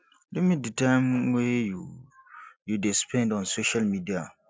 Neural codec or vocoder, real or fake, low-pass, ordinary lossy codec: none; real; none; none